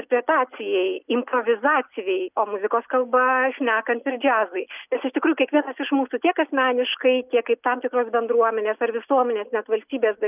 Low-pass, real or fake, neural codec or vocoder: 3.6 kHz; real; none